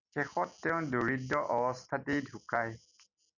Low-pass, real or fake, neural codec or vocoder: 7.2 kHz; real; none